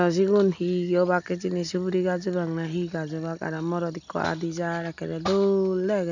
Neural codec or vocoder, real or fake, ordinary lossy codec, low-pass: none; real; none; 7.2 kHz